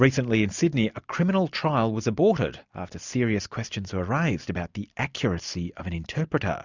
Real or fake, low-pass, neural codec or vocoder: real; 7.2 kHz; none